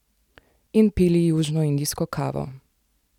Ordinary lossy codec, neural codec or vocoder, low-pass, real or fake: none; none; 19.8 kHz; real